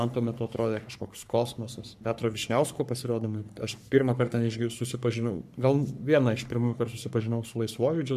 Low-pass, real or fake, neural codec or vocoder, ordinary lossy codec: 14.4 kHz; fake; codec, 44.1 kHz, 3.4 kbps, Pupu-Codec; MP3, 96 kbps